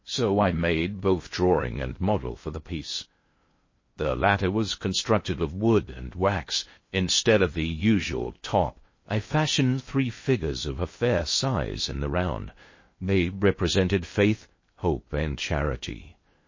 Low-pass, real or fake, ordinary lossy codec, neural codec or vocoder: 7.2 kHz; fake; MP3, 32 kbps; codec, 16 kHz in and 24 kHz out, 0.6 kbps, FocalCodec, streaming, 2048 codes